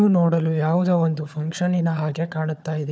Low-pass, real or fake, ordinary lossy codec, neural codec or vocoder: none; fake; none; codec, 16 kHz, 4 kbps, FunCodec, trained on Chinese and English, 50 frames a second